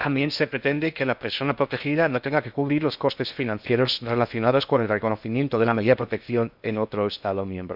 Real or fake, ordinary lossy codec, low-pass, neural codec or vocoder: fake; none; 5.4 kHz; codec, 16 kHz in and 24 kHz out, 0.6 kbps, FocalCodec, streaming, 2048 codes